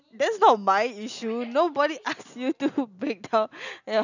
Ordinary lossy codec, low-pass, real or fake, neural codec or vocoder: none; 7.2 kHz; real; none